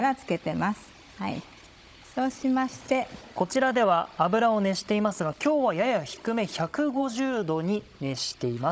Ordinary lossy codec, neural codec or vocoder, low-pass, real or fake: none; codec, 16 kHz, 16 kbps, FunCodec, trained on Chinese and English, 50 frames a second; none; fake